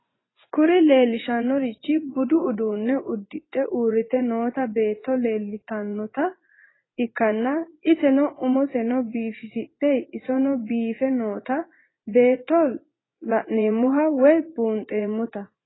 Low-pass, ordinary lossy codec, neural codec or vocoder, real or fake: 7.2 kHz; AAC, 16 kbps; vocoder, 24 kHz, 100 mel bands, Vocos; fake